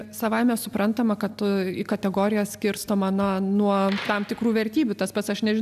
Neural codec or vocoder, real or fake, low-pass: none; real; 14.4 kHz